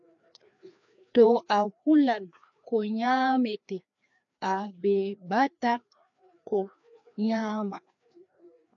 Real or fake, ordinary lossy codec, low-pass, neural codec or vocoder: fake; AAC, 64 kbps; 7.2 kHz; codec, 16 kHz, 2 kbps, FreqCodec, larger model